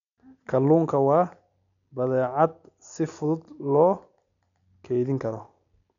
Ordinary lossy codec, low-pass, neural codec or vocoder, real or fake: none; 7.2 kHz; none; real